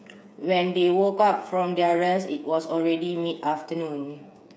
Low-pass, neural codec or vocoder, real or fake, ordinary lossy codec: none; codec, 16 kHz, 8 kbps, FreqCodec, smaller model; fake; none